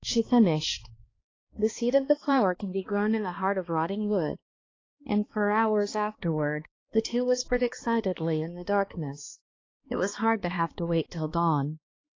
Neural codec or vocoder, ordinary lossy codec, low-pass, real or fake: codec, 16 kHz, 2 kbps, X-Codec, HuBERT features, trained on balanced general audio; AAC, 32 kbps; 7.2 kHz; fake